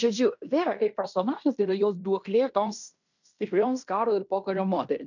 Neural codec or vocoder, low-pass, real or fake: codec, 16 kHz in and 24 kHz out, 0.9 kbps, LongCat-Audio-Codec, fine tuned four codebook decoder; 7.2 kHz; fake